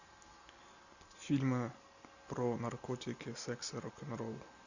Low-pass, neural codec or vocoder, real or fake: 7.2 kHz; none; real